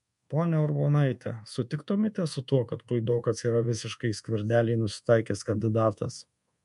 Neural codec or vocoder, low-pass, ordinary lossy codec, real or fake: codec, 24 kHz, 1.2 kbps, DualCodec; 10.8 kHz; MP3, 64 kbps; fake